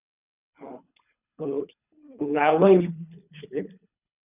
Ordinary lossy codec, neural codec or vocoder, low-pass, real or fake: none; codec, 24 kHz, 3 kbps, HILCodec; 3.6 kHz; fake